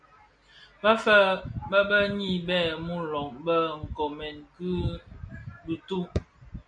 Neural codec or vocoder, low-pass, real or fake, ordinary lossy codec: none; 9.9 kHz; real; AAC, 64 kbps